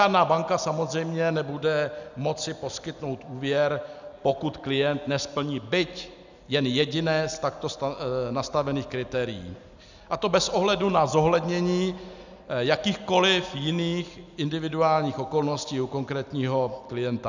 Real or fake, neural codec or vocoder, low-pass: real; none; 7.2 kHz